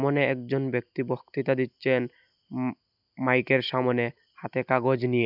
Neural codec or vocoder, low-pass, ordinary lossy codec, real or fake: none; 5.4 kHz; none; real